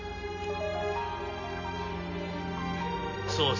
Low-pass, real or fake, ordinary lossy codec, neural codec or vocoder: 7.2 kHz; real; none; none